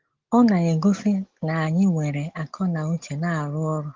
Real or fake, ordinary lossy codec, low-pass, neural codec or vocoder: real; Opus, 16 kbps; 7.2 kHz; none